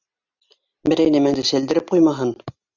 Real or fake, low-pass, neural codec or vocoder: real; 7.2 kHz; none